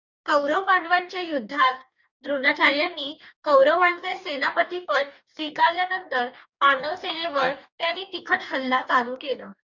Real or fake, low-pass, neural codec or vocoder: fake; 7.2 kHz; codec, 44.1 kHz, 2.6 kbps, DAC